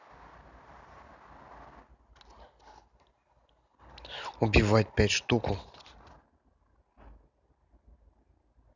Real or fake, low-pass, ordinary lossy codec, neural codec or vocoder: real; 7.2 kHz; none; none